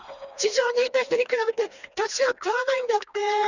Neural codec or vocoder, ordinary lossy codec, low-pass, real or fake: codec, 16 kHz, 2 kbps, FreqCodec, smaller model; none; 7.2 kHz; fake